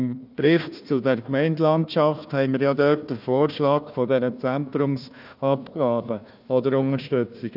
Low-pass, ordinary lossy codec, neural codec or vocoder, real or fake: 5.4 kHz; none; codec, 16 kHz, 1 kbps, FunCodec, trained on Chinese and English, 50 frames a second; fake